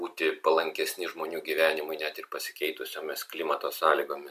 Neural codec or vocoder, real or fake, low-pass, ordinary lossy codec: none; real; 14.4 kHz; MP3, 96 kbps